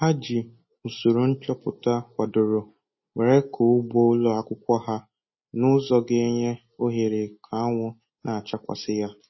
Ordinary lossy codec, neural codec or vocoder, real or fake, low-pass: MP3, 24 kbps; none; real; 7.2 kHz